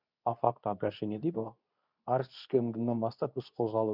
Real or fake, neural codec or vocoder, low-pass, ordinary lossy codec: fake; codec, 24 kHz, 0.9 kbps, WavTokenizer, medium speech release version 2; 5.4 kHz; none